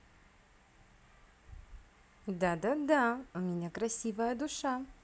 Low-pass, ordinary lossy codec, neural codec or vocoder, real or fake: none; none; none; real